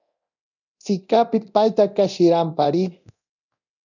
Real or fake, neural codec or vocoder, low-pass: fake; codec, 24 kHz, 0.9 kbps, DualCodec; 7.2 kHz